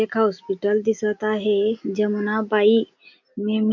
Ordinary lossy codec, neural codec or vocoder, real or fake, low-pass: MP3, 48 kbps; none; real; 7.2 kHz